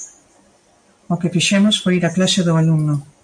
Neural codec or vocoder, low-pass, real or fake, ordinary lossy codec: none; 9.9 kHz; real; MP3, 96 kbps